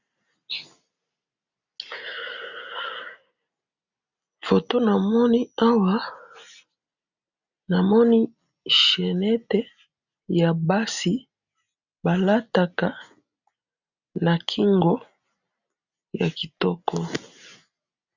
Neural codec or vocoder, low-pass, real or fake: none; 7.2 kHz; real